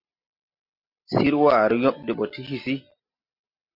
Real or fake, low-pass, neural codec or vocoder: real; 5.4 kHz; none